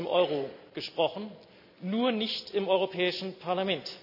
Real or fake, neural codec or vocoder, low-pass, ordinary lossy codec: real; none; 5.4 kHz; none